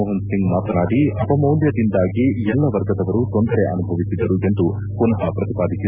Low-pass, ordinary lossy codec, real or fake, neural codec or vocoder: 3.6 kHz; none; real; none